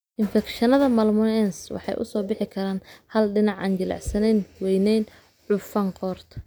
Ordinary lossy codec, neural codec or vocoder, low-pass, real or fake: none; none; none; real